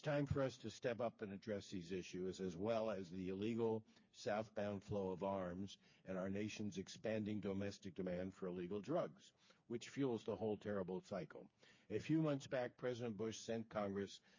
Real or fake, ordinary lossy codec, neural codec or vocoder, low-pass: fake; MP3, 32 kbps; codec, 16 kHz, 4 kbps, FreqCodec, smaller model; 7.2 kHz